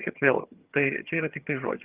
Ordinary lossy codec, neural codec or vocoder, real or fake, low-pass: Opus, 24 kbps; vocoder, 22.05 kHz, 80 mel bands, HiFi-GAN; fake; 3.6 kHz